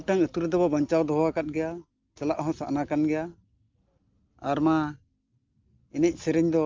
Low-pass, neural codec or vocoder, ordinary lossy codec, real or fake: 7.2 kHz; none; Opus, 24 kbps; real